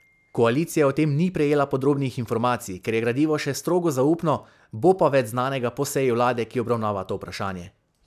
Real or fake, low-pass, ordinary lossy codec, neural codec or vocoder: real; 14.4 kHz; none; none